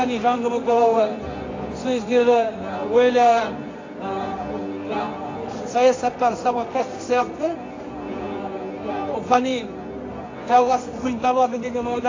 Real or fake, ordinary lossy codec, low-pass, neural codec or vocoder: fake; AAC, 32 kbps; 7.2 kHz; codec, 24 kHz, 0.9 kbps, WavTokenizer, medium music audio release